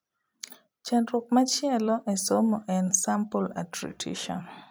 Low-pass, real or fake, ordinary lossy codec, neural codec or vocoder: none; fake; none; vocoder, 44.1 kHz, 128 mel bands every 256 samples, BigVGAN v2